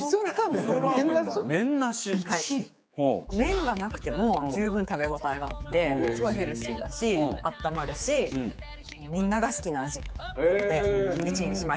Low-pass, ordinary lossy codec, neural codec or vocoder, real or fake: none; none; codec, 16 kHz, 4 kbps, X-Codec, HuBERT features, trained on general audio; fake